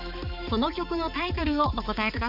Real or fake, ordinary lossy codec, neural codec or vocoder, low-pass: fake; none; codec, 16 kHz, 4 kbps, X-Codec, HuBERT features, trained on balanced general audio; 5.4 kHz